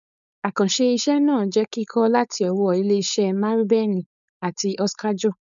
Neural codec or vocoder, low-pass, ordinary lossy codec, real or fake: codec, 16 kHz, 4.8 kbps, FACodec; 7.2 kHz; MP3, 96 kbps; fake